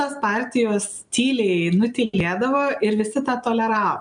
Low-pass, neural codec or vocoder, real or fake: 9.9 kHz; none; real